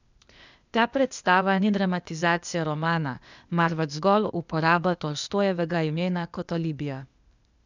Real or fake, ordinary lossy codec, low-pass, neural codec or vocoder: fake; none; 7.2 kHz; codec, 16 kHz, 0.8 kbps, ZipCodec